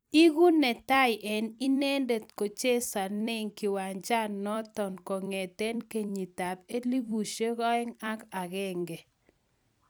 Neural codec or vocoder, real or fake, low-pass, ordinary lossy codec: vocoder, 44.1 kHz, 128 mel bands every 256 samples, BigVGAN v2; fake; none; none